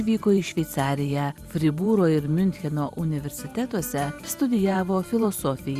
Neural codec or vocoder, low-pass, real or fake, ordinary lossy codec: vocoder, 44.1 kHz, 128 mel bands every 512 samples, BigVGAN v2; 14.4 kHz; fake; Opus, 64 kbps